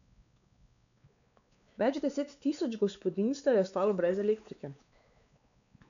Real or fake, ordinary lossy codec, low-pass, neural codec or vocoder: fake; none; 7.2 kHz; codec, 16 kHz, 4 kbps, X-Codec, WavLM features, trained on Multilingual LibriSpeech